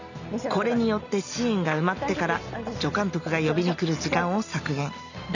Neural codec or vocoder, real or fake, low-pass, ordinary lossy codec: none; real; 7.2 kHz; none